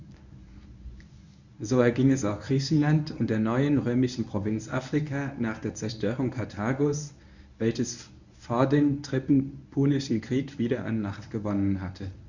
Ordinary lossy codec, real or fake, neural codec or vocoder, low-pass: none; fake; codec, 24 kHz, 0.9 kbps, WavTokenizer, medium speech release version 1; 7.2 kHz